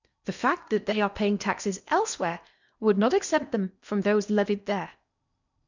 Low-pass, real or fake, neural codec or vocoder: 7.2 kHz; fake; codec, 16 kHz in and 24 kHz out, 0.8 kbps, FocalCodec, streaming, 65536 codes